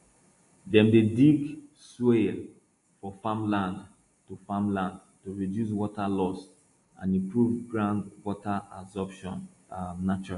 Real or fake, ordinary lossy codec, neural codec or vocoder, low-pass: real; AAC, 48 kbps; none; 10.8 kHz